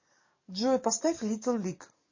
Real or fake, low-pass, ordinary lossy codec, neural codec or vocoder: fake; 7.2 kHz; MP3, 32 kbps; codec, 44.1 kHz, 7.8 kbps, DAC